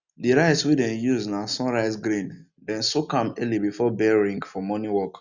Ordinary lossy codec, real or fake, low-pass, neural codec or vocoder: none; real; 7.2 kHz; none